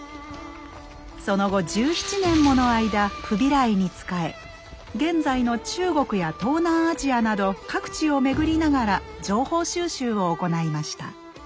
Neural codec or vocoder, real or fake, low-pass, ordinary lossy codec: none; real; none; none